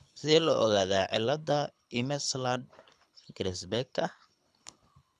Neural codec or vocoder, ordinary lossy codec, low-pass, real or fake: codec, 24 kHz, 6 kbps, HILCodec; none; none; fake